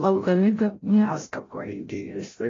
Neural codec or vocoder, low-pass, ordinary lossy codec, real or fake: codec, 16 kHz, 0.5 kbps, FreqCodec, larger model; 7.2 kHz; AAC, 32 kbps; fake